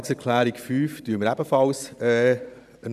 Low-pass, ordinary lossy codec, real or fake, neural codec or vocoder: 14.4 kHz; none; fake; vocoder, 44.1 kHz, 128 mel bands every 512 samples, BigVGAN v2